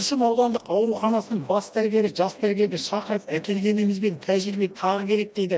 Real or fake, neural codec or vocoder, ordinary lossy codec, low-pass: fake; codec, 16 kHz, 1 kbps, FreqCodec, smaller model; none; none